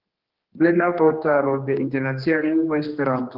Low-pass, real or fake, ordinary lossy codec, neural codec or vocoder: 5.4 kHz; fake; Opus, 16 kbps; codec, 16 kHz, 2 kbps, X-Codec, HuBERT features, trained on general audio